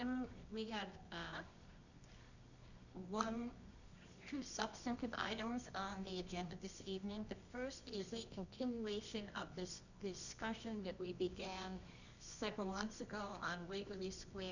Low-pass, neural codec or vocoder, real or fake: 7.2 kHz; codec, 24 kHz, 0.9 kbps, WavTokenizer, medium music audio release; fake